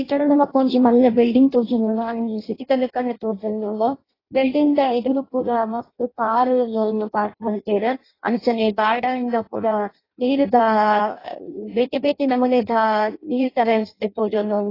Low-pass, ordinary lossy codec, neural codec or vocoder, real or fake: 5.4 kHz; AAC, 24 kbps; codec, 16 kHz in and 24 kHz out, 0.6 kbps, FireRedTTS-2 codec; fake